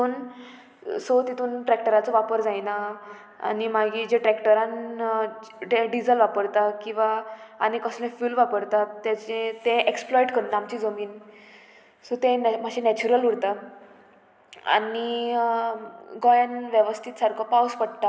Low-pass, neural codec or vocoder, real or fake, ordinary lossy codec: none; none; real; none